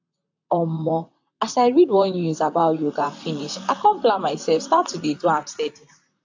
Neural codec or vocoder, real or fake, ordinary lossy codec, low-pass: vocoder, 44.1 kHz, 128 mel bands, Pupu-Vocoder; fake; AAC, 48 kbps; 7.2 kHz